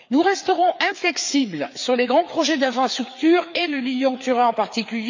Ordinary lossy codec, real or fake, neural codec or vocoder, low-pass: MP3, 64 kbps; fake; codec, 16 kHz, 4 kbps, FreqCodec, larger model; 7.2 kHz